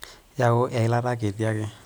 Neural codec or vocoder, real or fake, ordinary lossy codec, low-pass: none; real; none; none